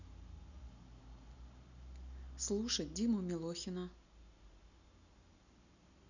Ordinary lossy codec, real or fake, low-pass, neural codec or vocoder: none; real; 7.2 kHz; none